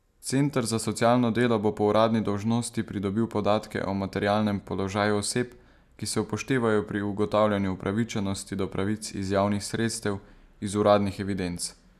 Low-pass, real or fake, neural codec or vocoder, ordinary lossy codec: 14.4 kHz; real; none; none